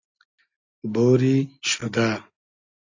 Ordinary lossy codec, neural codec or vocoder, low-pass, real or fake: AAC, 48 kbps; none; 7.2 kHz; real